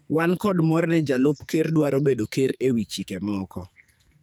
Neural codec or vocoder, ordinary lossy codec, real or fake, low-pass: codec, 44.1 kHz, 2.6 kbps, SNAC; none; fake; none